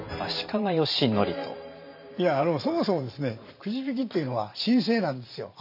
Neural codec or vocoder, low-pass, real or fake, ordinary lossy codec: vocoder, 44.1 kHz, 128 mel bands every 512 samples, BigVGAN v2; 5.4 kHz; fake; none